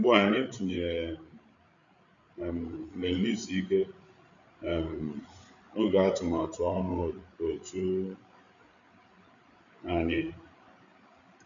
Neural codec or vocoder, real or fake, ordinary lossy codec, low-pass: codec, 16 kHz, 8 kbps, FreqCodec, larger model; fake; none; 7.2 kHz